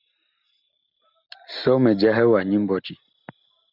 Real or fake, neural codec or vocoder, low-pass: real; none; 5.4 kHz